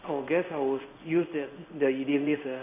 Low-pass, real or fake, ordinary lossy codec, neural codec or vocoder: 3.6 kHz; fake; none; codec, 16 kHz in and 24 kHz out, 1 kbps, XY-Tokenizer